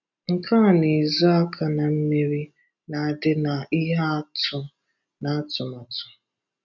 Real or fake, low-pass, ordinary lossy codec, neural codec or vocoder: real; 7.2 kHz; none; none